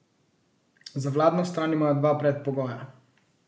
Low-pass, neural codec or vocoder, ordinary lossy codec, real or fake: none; none; none; real